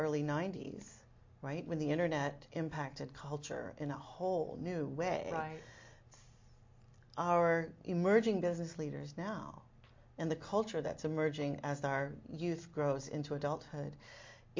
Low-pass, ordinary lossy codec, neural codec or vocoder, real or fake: 7.2 kHz; MP3, 48 kbps; none; real